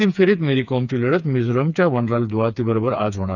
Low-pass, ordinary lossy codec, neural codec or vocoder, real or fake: 7.2 kHz; none; codec, 16 kHz, 4 kbps, FreqCodec, smaller model; fake